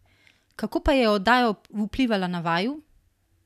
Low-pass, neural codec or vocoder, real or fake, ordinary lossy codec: 14.4 kHz; none; real; none